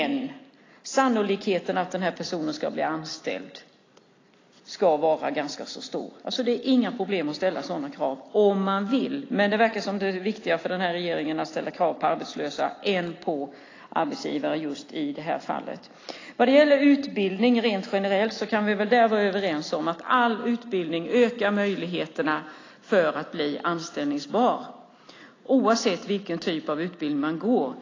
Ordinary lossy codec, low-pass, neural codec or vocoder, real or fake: AAC, 32 kbps; 7.2 kHz; none; real